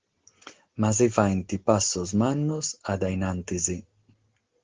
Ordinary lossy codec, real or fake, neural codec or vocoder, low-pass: Opus, 16 kbps; real; none; 7.2 kHz